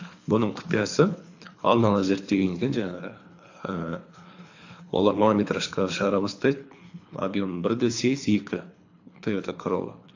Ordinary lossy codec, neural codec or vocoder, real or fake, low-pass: AAC, 48 kbps; codec, 24 kHz, 3 kbps, HILCodec; fake; 7.2 kHz